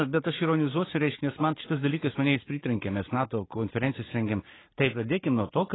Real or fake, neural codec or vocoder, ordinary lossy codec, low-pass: real; none; AAC, 16 kbps; 7.2 kHz